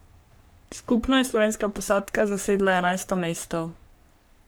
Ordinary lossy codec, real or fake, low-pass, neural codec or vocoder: none; fake; none; codec, 44.1 kHz, 3.4 kbps, Pupu-Codec